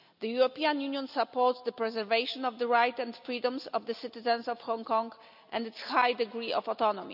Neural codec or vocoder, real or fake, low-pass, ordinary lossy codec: none; real; 5.4 kHz; none